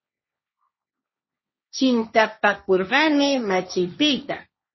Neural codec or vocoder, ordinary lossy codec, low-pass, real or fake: codec, 16 kHz, 1.1 kbps, Voila-Tokenizer; MP3, 24 kbps; 7.2 kHz; fake